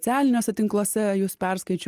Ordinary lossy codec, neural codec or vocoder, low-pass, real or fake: Opus, 32 kbps; none; 14.4 kHz; real